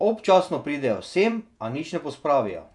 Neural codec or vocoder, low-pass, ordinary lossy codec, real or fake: vocoder, 48 kHz, 128 mel bands, Vocos; 10.8 kHz; none; fake